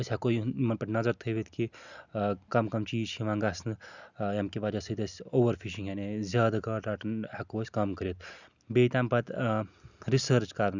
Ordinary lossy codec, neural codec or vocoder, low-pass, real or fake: none; none; 7.2 kHz; real